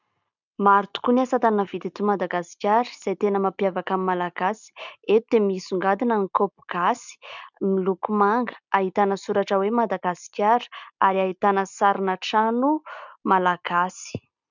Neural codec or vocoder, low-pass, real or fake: none; 7.2 kHz; real